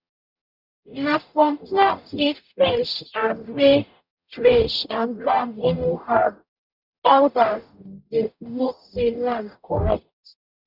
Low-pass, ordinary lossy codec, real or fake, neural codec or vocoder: 5.4 kHz; none; fake; codec, 44.1 kHz, 0.9 kbps, DAC